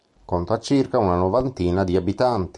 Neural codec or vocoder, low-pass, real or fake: none; 10.8 kHz; real